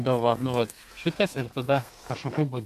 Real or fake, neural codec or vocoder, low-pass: fake; codec, 32 kHz, 1.9 kbps, SNAC; 14.4 kHz